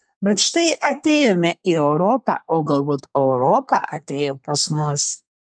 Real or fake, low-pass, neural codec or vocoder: fake; 9.9 kHz; codec, 24 kHz, 1 kbps, SNAC